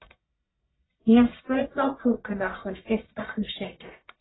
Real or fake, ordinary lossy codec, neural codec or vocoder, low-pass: fake; AAC, 16 kbps; codec, 44.1 kHz, 1.7 kbps, Pupu-Codec; 7.2 kHz